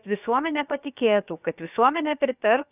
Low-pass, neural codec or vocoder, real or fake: 3.6 kHz; codec, 16 kHz, about 1 kbps, DyCAST, with the encoder's durations; fake